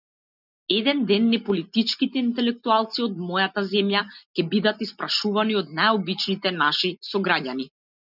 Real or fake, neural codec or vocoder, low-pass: real; none; 5.4 kHz